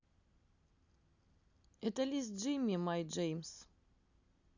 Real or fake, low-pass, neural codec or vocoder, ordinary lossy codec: real; 7.2 kHz; none; none